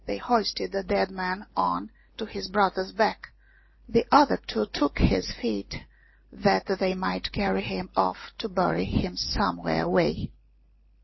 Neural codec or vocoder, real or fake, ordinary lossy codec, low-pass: none; real; MP3, 24 kbps; 7.2 kHz